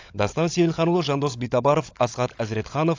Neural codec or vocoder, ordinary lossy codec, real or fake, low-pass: codec, 16 kHz, 16 kbps, FunCodec, trained on LibriTTS, 50 frames a second; AAC, 48 kbps; fake; 7.2 kHz